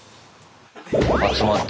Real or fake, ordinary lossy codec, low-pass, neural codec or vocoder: real; none; none; none